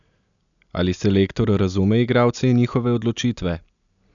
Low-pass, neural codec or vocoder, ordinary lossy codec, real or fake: 7.2 kHz; none; none; real